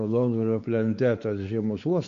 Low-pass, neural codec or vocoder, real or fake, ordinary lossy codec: 7.2 kHz; codec, 16 kHz, 4 kbps, X-Codec, WavLM features, trained on Multilingual LibriSpeech; fake; Opus, 64 kbps